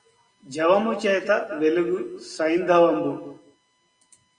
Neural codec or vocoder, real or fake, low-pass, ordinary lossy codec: none; real; 9.9 kHz; Opus, 64 kbps